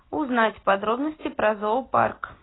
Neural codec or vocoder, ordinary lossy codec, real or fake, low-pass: none; AAC, 16 kbps; real; 7.2 kHz